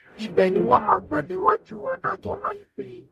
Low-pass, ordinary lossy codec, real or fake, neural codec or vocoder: 14.4 kHz; MP3, 64 kbps; fake; codec, 44.1 kHz, 0.9 kbps, DAC